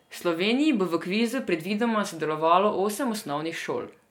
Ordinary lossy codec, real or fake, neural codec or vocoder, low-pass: MP3, 96 kbps; real; none; 19.8 kHz